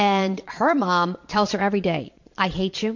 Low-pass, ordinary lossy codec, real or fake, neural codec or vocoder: 7.2 kHz; MP3, 48 kbps; fake; vocoder, 22.05 kHz, 80 mel bands, Vocos